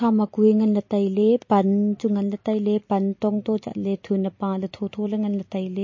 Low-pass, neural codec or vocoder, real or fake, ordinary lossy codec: 7.2 kHz; vocoder, 44.1 kHz, 128 mel bands every 256 samples, BigVGAN v2; fake; MP3, 32 kbps